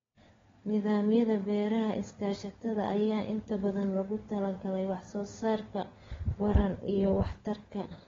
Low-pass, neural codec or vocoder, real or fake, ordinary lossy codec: 7.2 kHz; codec, 16 kHz, 4 kbps, FunCodec, trained on LibriTTS, 50 frames a second; fake; AAC, 24 kbps